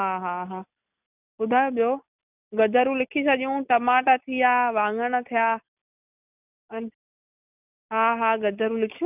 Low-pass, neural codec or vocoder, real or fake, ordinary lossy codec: 3.6 kHz; none; real; none